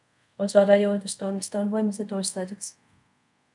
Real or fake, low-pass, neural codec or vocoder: fake; 10.8 kHz; codec, 24 kHz, 0.5 kbps, DualCodec